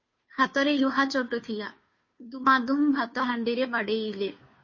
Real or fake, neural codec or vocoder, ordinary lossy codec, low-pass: fake; codec, 16 kHz, 2 kbps, FunCodec, trained on Chinese and English, 25 frames a second; MP3, 32 kbps; 7.2 kHz